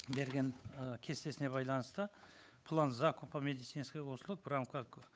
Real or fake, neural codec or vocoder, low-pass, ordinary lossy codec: fake; codec, 16 kHz, 8 kbps, FunCodec, trained on Chinese and English, 25 frames a second; none; none